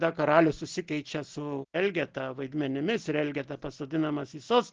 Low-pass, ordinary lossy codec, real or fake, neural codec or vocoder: 7.2 kHz; Opus, 16 kbps; real; none